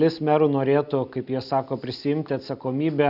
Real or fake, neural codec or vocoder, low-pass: real; none; 5.4 kHz